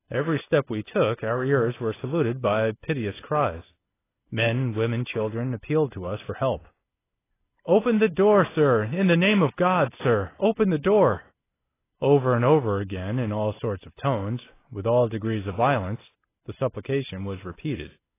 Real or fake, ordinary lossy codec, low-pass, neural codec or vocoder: real; AAC, 16 kbps; 3.6 kHz; none